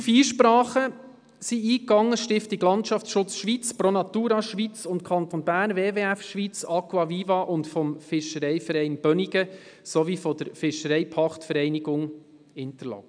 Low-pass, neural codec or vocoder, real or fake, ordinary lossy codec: 9.9 kHz; none; real; none